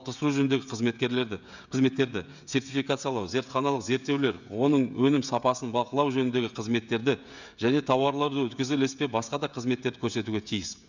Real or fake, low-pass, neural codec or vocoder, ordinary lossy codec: fake; 7.2 kHz; codec, 16 kHz, 16 kbps, FreqCodec, smaller model; none